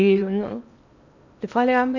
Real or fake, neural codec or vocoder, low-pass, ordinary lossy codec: fake; codec, 16 kHz in and 24 kHz out, 0.8 kbps, FocalCodec, streaming, 65536 codes; 7.2 kHz; none